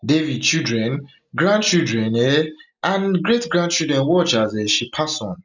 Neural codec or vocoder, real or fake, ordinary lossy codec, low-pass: none; real; none; 7.2 kHz